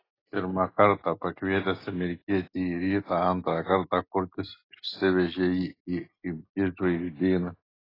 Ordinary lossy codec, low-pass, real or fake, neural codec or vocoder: AAC, 24 kbps; 5.4 kHz; real; none